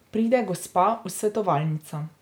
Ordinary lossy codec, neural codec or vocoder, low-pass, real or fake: none; none; none; real